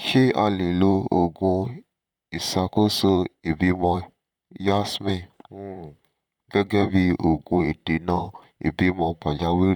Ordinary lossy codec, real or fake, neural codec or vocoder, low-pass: none; fake; vocoder, 44.1 kHz, 128 mel bands, Pupu-Vocoder; 19.8 kHz